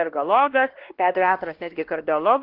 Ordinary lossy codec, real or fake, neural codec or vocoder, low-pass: Opus, 16 kbps; fake; codec, 16 kHz, 1 kbps, X-Codec, WavLM features, trained on Multilingual LibriSpeech; 5.4 kHz